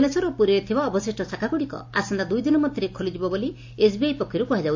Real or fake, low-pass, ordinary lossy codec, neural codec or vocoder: real; 7.2 kHz; AAC, 48 kbps; none